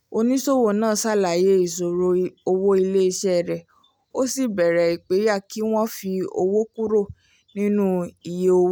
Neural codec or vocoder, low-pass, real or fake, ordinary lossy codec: none; 19.8 kHz; real; none